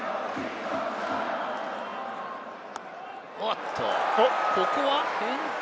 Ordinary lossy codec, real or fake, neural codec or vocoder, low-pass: none; real; none; none